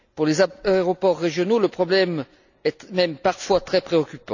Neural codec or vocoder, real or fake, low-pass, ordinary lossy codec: none; real; 7.2 kHz; none